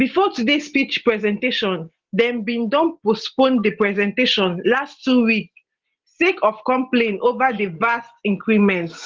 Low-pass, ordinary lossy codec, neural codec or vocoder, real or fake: 7.2 kHz; Opus, 16 kbps; none; real